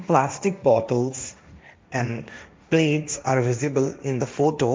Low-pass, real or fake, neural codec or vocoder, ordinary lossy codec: none; fake; codec, 16 kHz, 1.1 kbps, Voila-Tokenizer; none